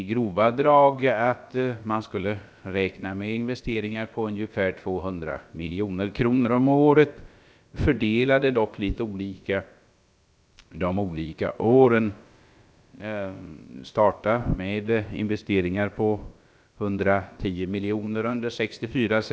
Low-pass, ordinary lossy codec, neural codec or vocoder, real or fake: none; none; codec, 16 kHz, about 1 kbps, DyCAST, with the encoder's durations; fake